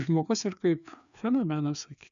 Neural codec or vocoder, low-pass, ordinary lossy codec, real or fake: codec, 16 kHz, 2 kbps, FreqCodec, larger model; 7.2 kHz; MP3, 96 kbps; fake